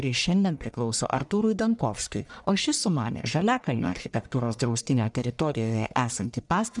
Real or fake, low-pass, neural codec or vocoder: fake; 10.8 kHz; codec, 44.1 kHz, 1.7 kbps, Pupu-Codec